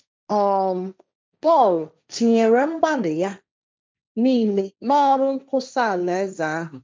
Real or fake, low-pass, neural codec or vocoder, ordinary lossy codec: fake; none; codec, 16 kHz, 1.1 kbps, Voila-Tokenizer; none